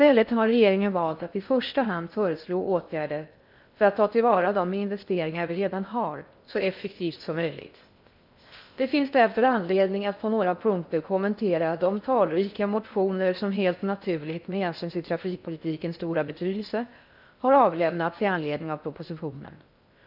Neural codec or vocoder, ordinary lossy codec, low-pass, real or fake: codec, 16 kHz in and 24 kHz out, 0.6 kbps, FocalCodec, streaming, 4096 codes; none; 5.4 kHz; fake